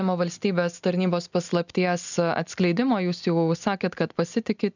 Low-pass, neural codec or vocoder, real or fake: 7.2 kHz; none; real